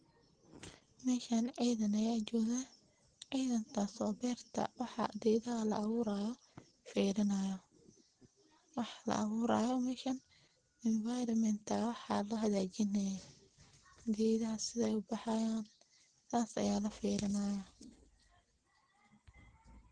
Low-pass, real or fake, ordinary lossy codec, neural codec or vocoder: 9.9 kHz; real; Opus, 16 kbps; none